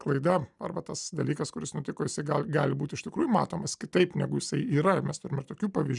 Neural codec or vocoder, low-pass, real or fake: none; 10.8 kHz; real